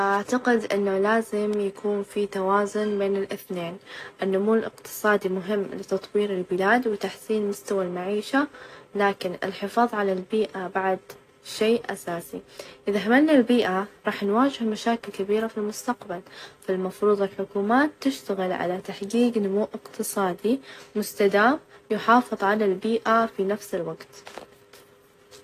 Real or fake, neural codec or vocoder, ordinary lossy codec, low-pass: real; none; AAC, 48 kbps; 14.4 kHz